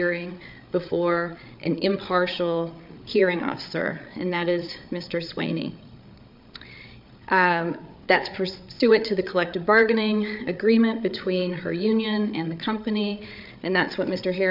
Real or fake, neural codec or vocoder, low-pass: fake; codec, 16 kHz, 8 kbps, FreqCodec, larger model; 5.4 kHz